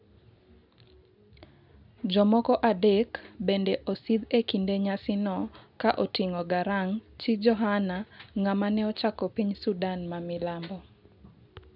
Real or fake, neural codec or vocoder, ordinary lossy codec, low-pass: real; none; none; 5.4 kHz